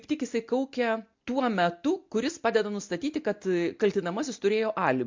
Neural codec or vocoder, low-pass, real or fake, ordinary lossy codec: none; 7.2 kHz; real; MP3, 48 kbps